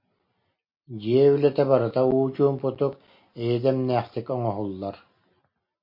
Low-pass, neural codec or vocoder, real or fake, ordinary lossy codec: 5.4 kHz; none; real; MP3, 32 kbps